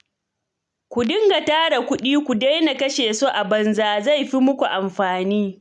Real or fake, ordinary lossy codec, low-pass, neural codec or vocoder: real; none; 10.8 kHz; none